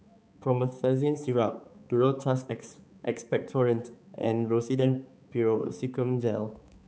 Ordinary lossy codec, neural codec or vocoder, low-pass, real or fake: none; codec, 16 kHz, 4 kbps, X-Codec, HuBERT features, trained on balanced general audio; none; fake